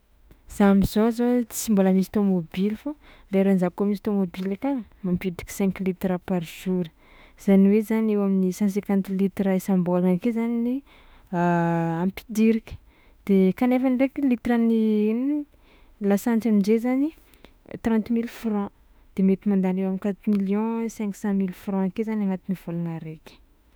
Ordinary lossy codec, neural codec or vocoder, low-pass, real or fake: none; autoencoder, 48 kHz, 32 numbers a frame, DAC-VAE, trained on Japanese speech; none; fake